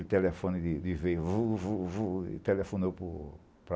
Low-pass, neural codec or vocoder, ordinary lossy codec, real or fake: none; none; none; real